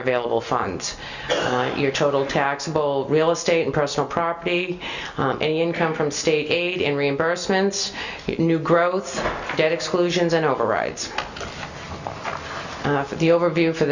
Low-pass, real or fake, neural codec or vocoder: 7.2 kHz; real; none